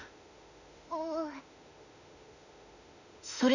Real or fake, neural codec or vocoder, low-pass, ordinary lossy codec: fake; autoencoder, 48 kHz, 32 numbers a frame, DAC-VAE, trained on Japanese speech; 7.2 kHz; none